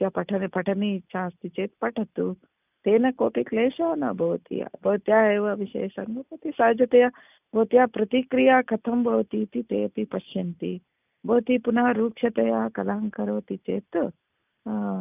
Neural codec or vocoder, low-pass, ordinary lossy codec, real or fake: none; 3.6 kHz; none; real